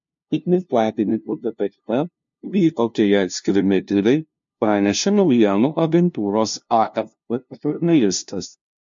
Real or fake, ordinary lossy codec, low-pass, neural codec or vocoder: fake; MP3, 48 kbps; 7.2 kHz; codec, 16 kHz, 0.5 kbps, FunCodec, trained on LibriTTS, 25 frames a second